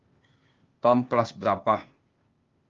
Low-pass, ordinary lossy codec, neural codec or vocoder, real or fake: 7.2 kHz; Opus, 32 kbps; codec, 16 kHz, 0.8 kbps, ZipCodec; fake